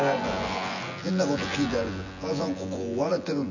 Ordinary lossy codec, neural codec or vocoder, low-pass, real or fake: none; vocoder, 24 kHz, 100 mel bands, Vocos; 7.2 kHz; fake